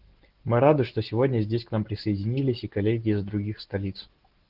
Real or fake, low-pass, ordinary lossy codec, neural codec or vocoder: real; 5.4 kHz; Opus, 16 kbps; none